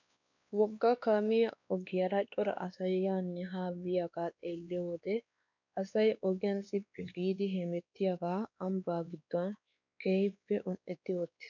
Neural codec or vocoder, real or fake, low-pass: codec, 16 kHz, 2 kbps, X-Codec, WavLM features, trained on Multilingual LibriSpeech; fake; 7.2 kHz